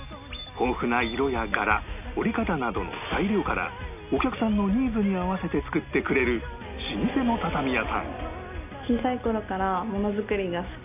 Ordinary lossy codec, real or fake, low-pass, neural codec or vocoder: none; real; 3.6 kHz; none